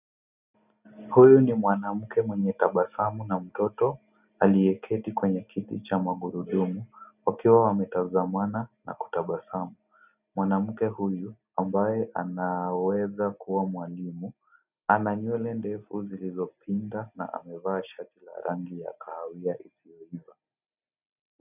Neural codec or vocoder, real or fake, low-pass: none; real; 3.6 kHz